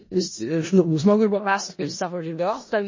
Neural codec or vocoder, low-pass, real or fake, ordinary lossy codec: codec, 16 kHz in and 24 kHz out, 0.4 kbps, LongCat-Audio-Codec, four codebook decoder; 7.2 kHz; fake; MP3, 32 kbps